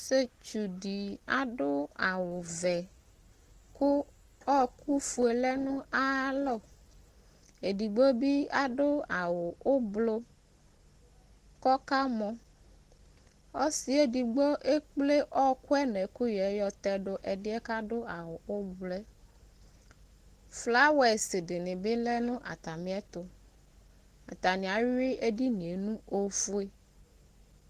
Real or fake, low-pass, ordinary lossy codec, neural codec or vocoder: real; 14.4 kHz; Opus, 16 kbps; none